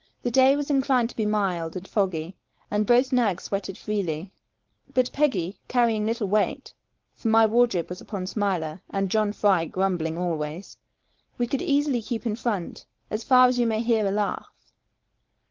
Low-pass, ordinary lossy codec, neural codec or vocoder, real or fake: 7.2 kHz; Opus, 16 kbps; codec, 16 kHz, 4.8 kbps, FACodec; fake